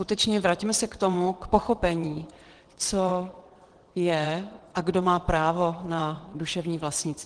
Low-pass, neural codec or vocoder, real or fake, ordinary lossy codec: 9.9 kHz; vocoder, 22.05 kHz, 80 mel bands, WaveNeXt; fake; Opus, 16 kbps